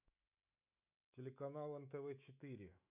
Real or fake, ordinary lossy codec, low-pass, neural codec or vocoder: fake; none; 3.6 kHz; autoencoder, 48 kHz, 128 numbers a frame, DAC-VAE, trained on Japanese speech